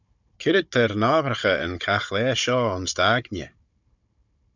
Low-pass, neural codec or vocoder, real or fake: 7.2 kHz; codec, 16 kHz, 16 kbps, FunCodec, trained on Chinese and English, 50 frames a second; fake